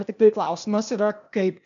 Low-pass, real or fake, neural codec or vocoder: 7.2 kHz; fake; codec, 16 kHz, 0.8 kbps, ZipCodec